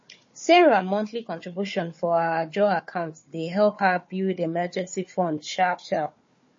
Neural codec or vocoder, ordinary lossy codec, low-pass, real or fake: codec, 16 kHz, 4 kbps, FunCodec, trained on Chinese and English, 50 frames a second; MP3, 32 kbps; 7.2 kHz; fake